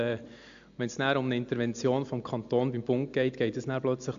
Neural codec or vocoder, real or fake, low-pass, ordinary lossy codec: none; real; 7.2 kHz; AAC, 64 kbps